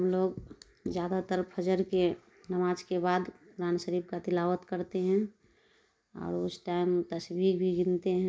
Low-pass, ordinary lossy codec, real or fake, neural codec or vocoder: none; none; real; none